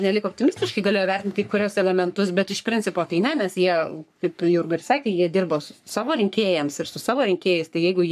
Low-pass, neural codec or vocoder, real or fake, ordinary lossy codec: 14.4 kHz; codec, 44.1 kHz, 3.4 kbps, Pupu-Codec; fake; AAC, 96 kbps